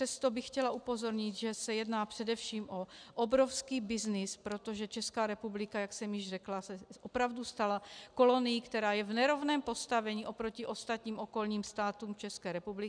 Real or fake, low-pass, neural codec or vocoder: real; 9.9 kHz; none